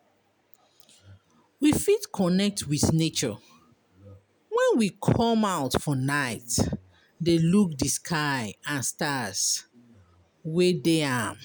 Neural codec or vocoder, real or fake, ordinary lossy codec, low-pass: none; real; none; none